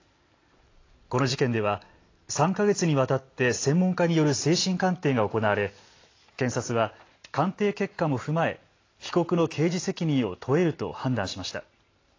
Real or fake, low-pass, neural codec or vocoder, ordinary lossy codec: real; 7.2 kHz; none; AAC, 32 kbps